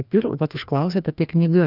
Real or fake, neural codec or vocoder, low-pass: fake; codec, 16 kHz, 1 kbps, FreqCodec, larger model; 5.4 kHz